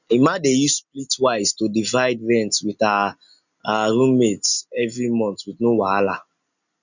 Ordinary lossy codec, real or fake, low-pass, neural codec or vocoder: none; real; 7.2 kHz; none